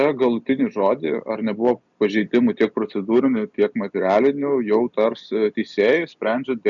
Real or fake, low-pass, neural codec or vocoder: real; 7.2 kHz; none